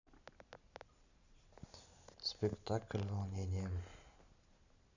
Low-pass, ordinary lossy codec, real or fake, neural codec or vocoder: 7.2 kHz; none; real; none